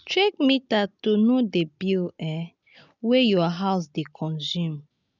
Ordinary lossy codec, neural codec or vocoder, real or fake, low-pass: none; none; real; 7.2 kHz